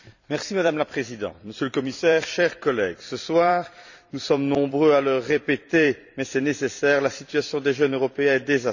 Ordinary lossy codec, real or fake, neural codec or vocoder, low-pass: none; fake; vocoder, 44.1 kHz, 128 mel bands every 256 samples, BigVGAN v2; 7.2 kHz